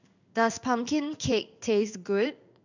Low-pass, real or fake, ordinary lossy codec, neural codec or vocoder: 7.2 kHz; fake; none; codec, 16 kHz in and 24 kHz out, 1 kbps, XY-Tokenizer